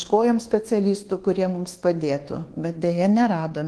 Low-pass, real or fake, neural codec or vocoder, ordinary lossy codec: 10.8 kHz; fake; codec, 24 kHz, 1.2 kbps, DualCodec; Opus, 16 kbps